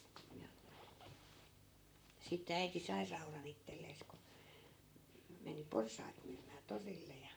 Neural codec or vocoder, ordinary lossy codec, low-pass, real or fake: vocoder, 44.1 kHz, 128 mel bands, Pupu-Vocoder; none; none; fake